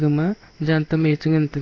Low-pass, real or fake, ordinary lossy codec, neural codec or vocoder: 7.2 kHz; fake; AAC, 32 kbps; codec, 16 kHz in and 24 kHz out, 1 kbps, XY-Tokenizer